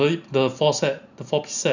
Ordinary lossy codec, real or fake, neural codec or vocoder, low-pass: none; real; none; 7.2 kHz